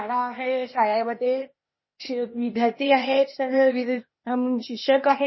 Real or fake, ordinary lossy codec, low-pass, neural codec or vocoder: fake; MP3, 24 kbps; 7.2 kHz; codec, 16 kHz, 0.8 kbps, ZipCodec